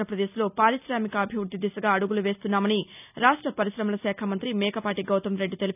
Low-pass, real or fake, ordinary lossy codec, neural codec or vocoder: 3.6 kHz; real; none; none